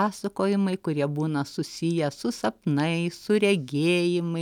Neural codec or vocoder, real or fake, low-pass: none; real; 19.8 kHz